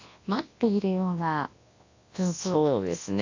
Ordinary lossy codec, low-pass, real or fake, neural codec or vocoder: AAC, 48 kbps; 7.2 kHz; fake; codec, 24 kHz, 0.9 kbps, WavTokenizer, large speech release